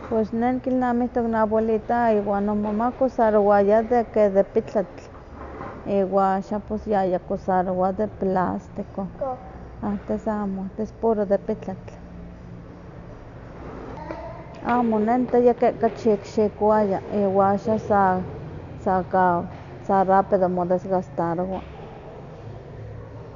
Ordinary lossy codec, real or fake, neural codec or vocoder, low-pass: none; real; none; 7.2 kHz